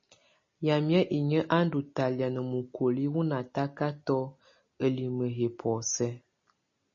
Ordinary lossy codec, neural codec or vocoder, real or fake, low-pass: MP3, 32 kbps; none; real; 7.2 kHz